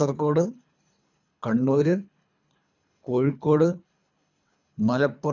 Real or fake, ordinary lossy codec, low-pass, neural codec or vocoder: fake; none; 7.2 kHz; codec, 24 kHz, 3 kbps, HILCodec